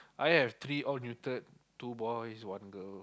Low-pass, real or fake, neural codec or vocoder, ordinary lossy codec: none; real; none; none